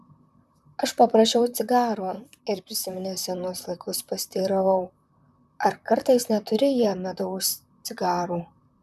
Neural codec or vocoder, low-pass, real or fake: vocoder, 44.1 kHz, 128 mel bands, Pupu-Vocoder; 14.4 kHz; fake